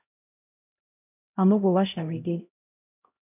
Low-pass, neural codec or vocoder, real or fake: 3.6 kHz; codec, 16 kHz, 0.5 kbps, X-Codec, HuBERT features, trained on LibriSpeech; fake